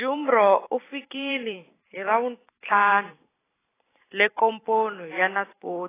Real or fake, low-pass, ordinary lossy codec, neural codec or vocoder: fake; 3.6 kHz; AAC, 16 kbps; vocoder, 44.1 kHz, 128 mel bands every 512 samples, BigVGAN v2